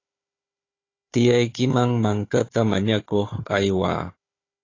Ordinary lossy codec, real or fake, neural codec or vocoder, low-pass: AAC, 32 kbps; fake; codec, 16 kHz, 4 kbps, FunCodec, trained on Chinese and English, 50 frames a second; 7.2 kHz